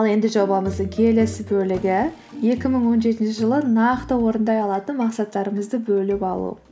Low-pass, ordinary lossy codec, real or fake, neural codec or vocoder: none; none; real; none